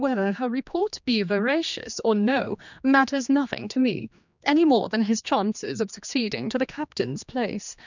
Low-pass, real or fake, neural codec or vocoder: 7.2 kHz; fake; codec, 16 kHz, 2 kbps, X-Codec, HuBERT features, trained on general audio